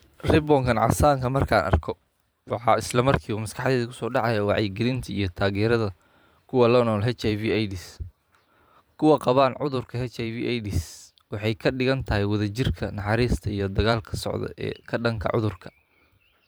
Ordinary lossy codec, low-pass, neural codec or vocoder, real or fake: none; none; vocoder, 44.1 kHz, 128 mel bands every 512 samples, BigVGAN v2; fake